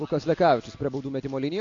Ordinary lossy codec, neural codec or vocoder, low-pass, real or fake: AAC, 48 kbps; none; 7.2 kHz; real